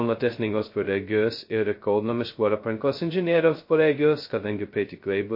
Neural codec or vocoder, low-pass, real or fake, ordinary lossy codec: codec, 16 kHz, 0.2 kbps, FocalCodec; 5.4 kHz; fake; MP3, 32 kbps